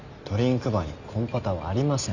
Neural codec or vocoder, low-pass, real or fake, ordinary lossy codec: none; 7.2 kHz; real; none